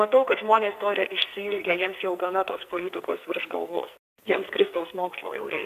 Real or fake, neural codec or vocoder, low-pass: fake; codec, 44.1 kHz, 2.6 kbps, SNAC; 14.4 kHz